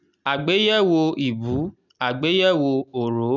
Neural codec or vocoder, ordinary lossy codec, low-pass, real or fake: none; none; 7.2 kHz; real